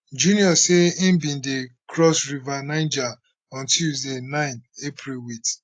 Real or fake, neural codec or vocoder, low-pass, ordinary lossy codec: real; none; 9.9 kHz; AAC, 48 kbps